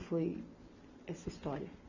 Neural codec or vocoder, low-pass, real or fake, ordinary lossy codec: none; 7.2 kHz; real; none